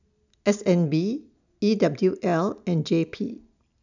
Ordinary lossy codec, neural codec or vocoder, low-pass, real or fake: none; none; 7.2 kHz; real